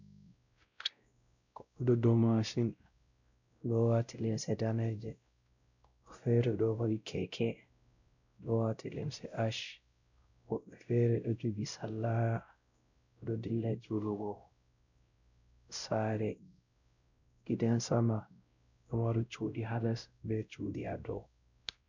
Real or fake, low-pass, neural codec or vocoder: fake; 7.2 kHz; codec, 16 kHz, 0.5 kbps, X-Codec, WavLM features, trained on Multilingual LibriSpeech